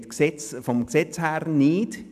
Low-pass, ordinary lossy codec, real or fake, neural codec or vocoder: 14.4 kHz; none; real; none